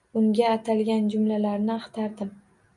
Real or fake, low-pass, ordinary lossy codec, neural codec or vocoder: real; 10.8 kHz; MP3, 96 kbps; none